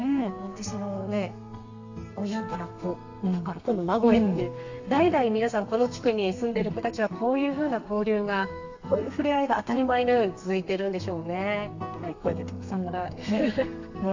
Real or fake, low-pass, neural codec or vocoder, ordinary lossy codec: fake; 7.2 kHz; codec, 32 kHz, 1.9 kbps, SNAC; AAC, 48 kbps